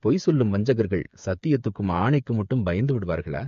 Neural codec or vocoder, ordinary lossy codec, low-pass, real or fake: codec, 16 kHz, 8 kbps, FreqCodec, smaller model; MP3, 48 kbps; 7.2 kHz; fake